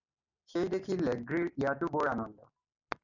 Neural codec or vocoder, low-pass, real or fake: none; 7.2 kHz; real